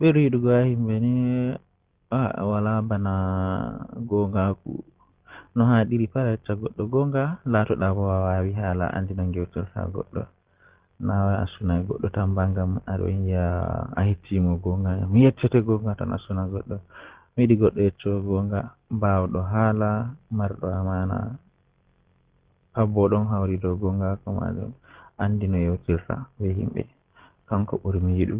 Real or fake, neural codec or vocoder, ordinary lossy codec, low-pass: real; none; Opus, 16 kbps; 3.6 kHz